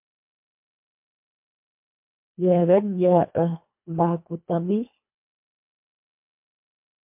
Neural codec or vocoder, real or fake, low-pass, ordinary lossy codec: codec, 24 kHz, 1.5 kbps, HILCodec; fake; 3.6 kHz; MP3, 32 kbps